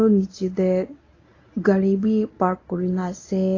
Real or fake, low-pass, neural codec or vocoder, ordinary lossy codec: fake; 7.2 kHz; codec, 24 kHz, 0.9 kbps, WavTokenizer, medium speech release version 1; AAC, 32 kbps